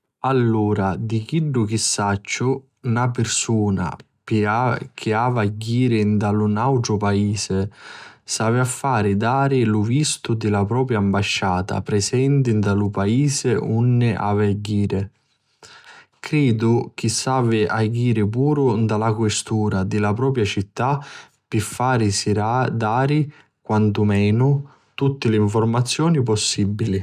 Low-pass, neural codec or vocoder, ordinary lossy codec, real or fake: 14.4 kHz; none; none; real